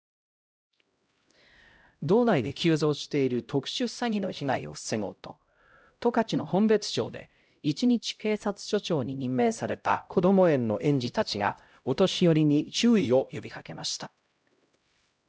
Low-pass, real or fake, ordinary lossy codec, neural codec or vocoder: none; fake; none; codec, 16 kHz, 0.5 kbps, X-Codec, HuBERT features, trained on LibriSpeech